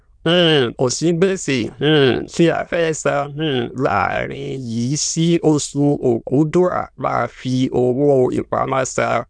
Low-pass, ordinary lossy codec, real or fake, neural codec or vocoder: 9.9 kHz; none; fake; autoencoder, 22.05 kHz, a latent of 192 numbers a frame, VITS, trained on many speakers